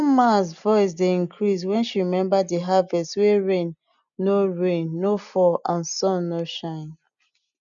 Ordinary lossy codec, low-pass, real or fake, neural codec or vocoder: none; 7.2 kHz; real; none